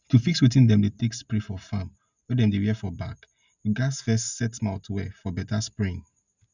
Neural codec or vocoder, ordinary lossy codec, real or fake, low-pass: none; none; real; 7.2 kHz